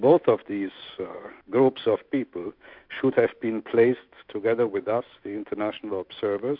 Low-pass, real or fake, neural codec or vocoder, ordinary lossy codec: 5.4 kHz; fake; autoencoder, 48 kHz, 128 numbers a frame, DAC-VAE, trained on Japanese speech; MP3, 48 kbps